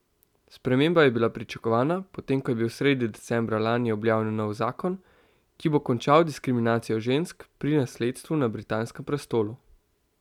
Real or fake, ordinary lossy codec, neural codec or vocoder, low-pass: real; none; none; 19.8 kHz